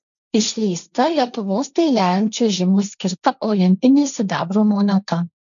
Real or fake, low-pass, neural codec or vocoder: fake; 7.2 kHz; codec, 16 kHz, 1.1 kbps, Voila-Tokenizer